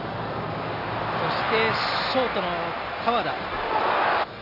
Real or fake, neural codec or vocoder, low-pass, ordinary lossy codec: real; none; 5.4 kHz; none